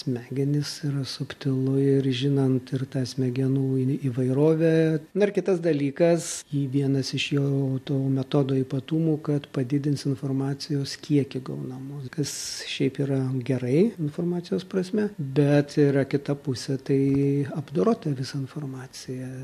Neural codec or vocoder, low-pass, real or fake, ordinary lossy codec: none; 14.4 kHz; real; MP3, 64 kbps